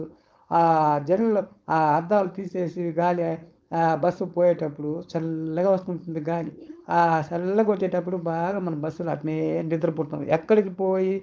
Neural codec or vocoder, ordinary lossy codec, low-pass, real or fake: codec, 16 kHz, 4.8 kbps, FACodec; none; none; fake